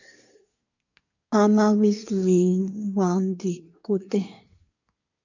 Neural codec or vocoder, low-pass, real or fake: codec, 24 kHz, 1 kbps, SNAC; 7.2 kHz; fake